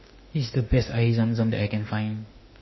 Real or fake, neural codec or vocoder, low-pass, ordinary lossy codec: fake; autoencoder, 48 kHz, 32 numbers a frame, DAC-VAE, trained on Japanese speech; 7.2 kHz; MP3, 24 kbps